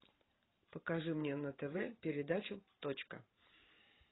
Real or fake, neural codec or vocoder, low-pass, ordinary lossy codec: fake; vocoder, 44.1 kHz, 128 mel bands every 512 samples, BigVGAN v2; 7.2 kHz; AAC, 16 kbps